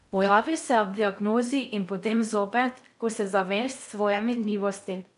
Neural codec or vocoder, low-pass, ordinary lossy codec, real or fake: codec, 16 kHz in and 24 kHz out, 0.6 kbps, FocalCodec, streaming, 2048 codes; 10.8 kHz; MP3, 96 kbps; fake